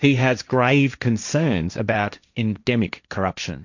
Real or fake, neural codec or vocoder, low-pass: fake; codec, 16 kHz, 1.1 kbps, Voila-Tokenizer; 7.2 kHz